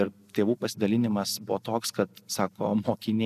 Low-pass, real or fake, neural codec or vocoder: 14.4 kHz; real; none